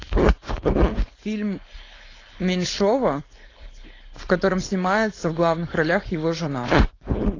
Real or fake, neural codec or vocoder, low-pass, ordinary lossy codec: fake; codec, 16 kHz, 4.8 kbps, FACodec; 7.2 kHz; AAC, 32 kbps